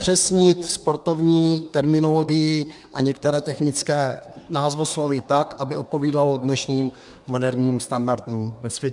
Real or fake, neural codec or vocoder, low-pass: fake; codec, 24 kHz, 1 kbps, SNAC; 10.8 kHz